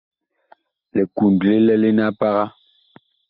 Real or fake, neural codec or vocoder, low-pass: real; none; 5.4 kHz